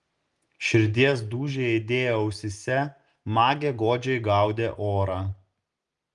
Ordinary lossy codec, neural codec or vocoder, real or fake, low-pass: Opus, 32 kbps; none; real; 10.8 kHz